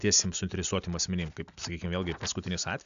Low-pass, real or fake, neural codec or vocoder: 7.2 kHz; real; none